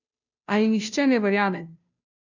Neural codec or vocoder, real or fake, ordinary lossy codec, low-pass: codec, 16 kHz, 0.5 kbps, FunCodec, trained on Chinese and English, 25 frames a second; fake; none; 7.2 kHz